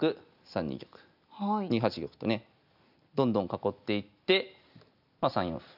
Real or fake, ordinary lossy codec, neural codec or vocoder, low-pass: real; none; none; 5.4 kHz